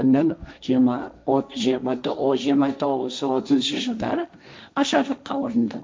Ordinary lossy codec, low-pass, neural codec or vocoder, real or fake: none; none; codec, 16 kHz, 1.1 kbps, Voila-Tokenizer; fake